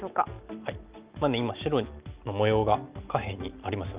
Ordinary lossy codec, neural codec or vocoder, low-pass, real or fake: Opus, 24 kbps; none; 3.6 kHz; real